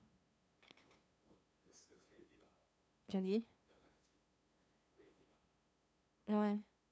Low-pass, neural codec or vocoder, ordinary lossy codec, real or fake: none; codec, 16 kHz, 2 kbps, FunCodec, trained on LibriTTS, 25 frames a second; none; fake